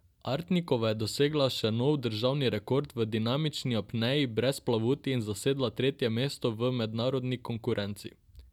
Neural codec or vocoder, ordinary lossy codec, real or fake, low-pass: none; none; real; 19.8 kHz